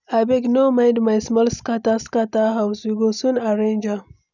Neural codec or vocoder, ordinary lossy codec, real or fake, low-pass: none; none; real; 7.2 kHz